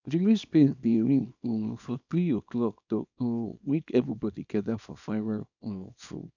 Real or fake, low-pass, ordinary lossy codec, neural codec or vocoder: fake; 7.2 kHz; none; codec, 24 kHz, 0.9 kbps, WavTokenizer, small release